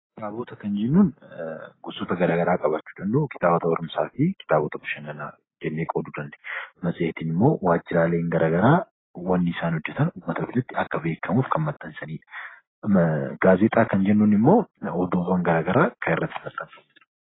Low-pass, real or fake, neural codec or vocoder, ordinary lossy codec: 7.2 kHz; real; none; AAC, 16 kbps